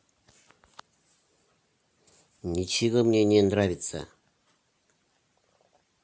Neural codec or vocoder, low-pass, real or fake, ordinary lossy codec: none; none; real; none